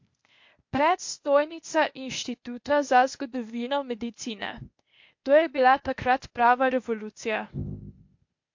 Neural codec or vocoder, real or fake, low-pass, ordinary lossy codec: codec, 16 kHz, 0.8 kbps, ZipCodec; fake; 7.2 kHz; MP3, 48 kbps